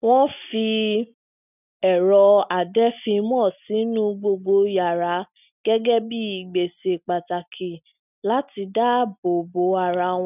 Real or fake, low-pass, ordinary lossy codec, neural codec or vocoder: real; 3.6 kHz; none; none